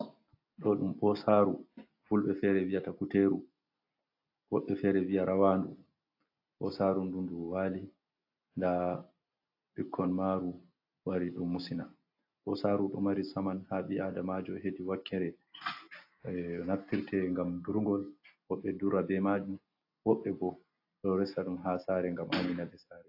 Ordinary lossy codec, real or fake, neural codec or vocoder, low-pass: AAC, 32 kbps; real; none; 5.4 kHz